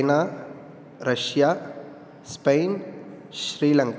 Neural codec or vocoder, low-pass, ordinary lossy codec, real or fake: none; none; none; real